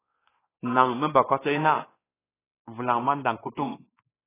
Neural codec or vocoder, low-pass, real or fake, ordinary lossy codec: codec, 16 kHz, 2 kbps, X-Codec, WavLM features, trained on Multilingual LibriSpeech; 3.6 kHz; fake; AAC, 16 kbps